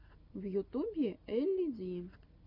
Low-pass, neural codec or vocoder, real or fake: 5.4 kHz; none; real